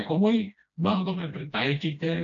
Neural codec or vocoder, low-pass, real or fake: codec, 16 kHz, 1 kbps, FreqCodec, smaller model; 7.2 kHz; fake